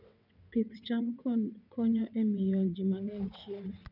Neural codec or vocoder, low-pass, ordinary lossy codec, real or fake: vocoder, 22.05 kHz, 80 mel bands, WaveNeXt; 5.4 kHz; none; fake